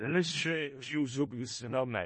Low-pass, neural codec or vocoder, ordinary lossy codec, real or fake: 10.8 kHz; codec, 16 kHz in and 24 kHz out, 0.4 kbps, LongCat-Audio-Codec, four codebook decoder; MP3, 32 kbps; fake